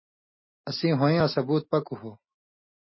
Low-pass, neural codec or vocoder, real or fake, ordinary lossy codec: 7.2 kHz; none; real; MP3, 24 kbps